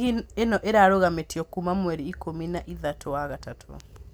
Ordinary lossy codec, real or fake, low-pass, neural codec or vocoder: none; real; none; none